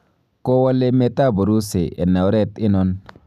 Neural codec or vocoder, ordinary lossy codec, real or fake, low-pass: none; none; real; 14.4 kHz